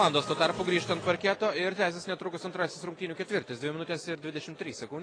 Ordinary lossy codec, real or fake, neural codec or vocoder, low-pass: AAC, 32 kbps; real; none; 9.9 kHz